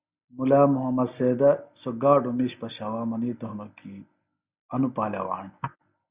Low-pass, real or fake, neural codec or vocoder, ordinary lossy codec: 3.6 kHz; real; none; Opus, 64 kbps